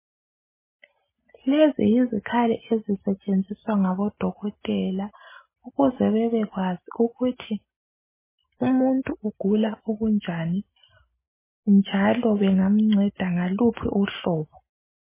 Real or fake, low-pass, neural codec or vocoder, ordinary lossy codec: real; 3.6 kHz; none; MP3, 16 kbps